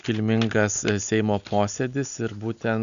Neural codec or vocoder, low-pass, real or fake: none; 7.2 kHz; real